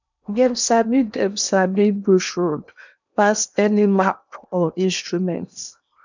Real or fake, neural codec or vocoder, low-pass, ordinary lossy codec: fake; codec, 16 kHz in and 24 kHz out, 0.8 kbps, FocalCodec, streaming, 65536 codes; 7.2 kHz; none